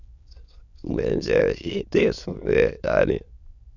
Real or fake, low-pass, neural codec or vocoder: fake; 7.2 kHz; autoencoder, 22.05 kHz, a latent of 192 numbers a frame, VITS, trained on many speakers